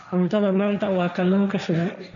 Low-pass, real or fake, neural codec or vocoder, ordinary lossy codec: 7.2 kHz; fake; codec, 16 kHz, 1.1 kbps, Voila-Tokenizer; none